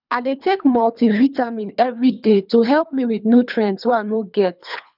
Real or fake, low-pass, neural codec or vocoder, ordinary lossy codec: fake; 5.4 kHz; codec, 24 kHz, 3 kbps, HILCodec; none